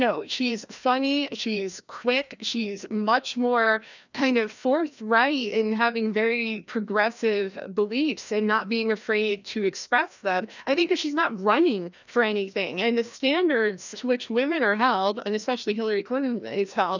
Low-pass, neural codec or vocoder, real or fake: 7.2 kHz; codec, 16 kHz, 1 kbps, FreqCodec, larger model; fake